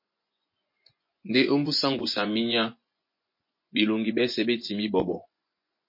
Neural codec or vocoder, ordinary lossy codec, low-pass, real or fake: vocoder, 24 kHz, 100 mel bands, Vocos; MP3, 32 kbps; 5.4 kHz; fake